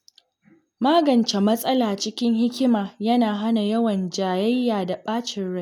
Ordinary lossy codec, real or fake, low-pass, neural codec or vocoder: none; real; 19.8 kHz; none